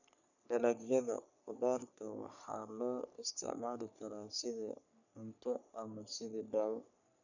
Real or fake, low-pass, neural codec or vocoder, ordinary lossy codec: fake; 7.2 kHz; codec, 44.1 kHz, 3.4 kbps, Pupu-Codec; none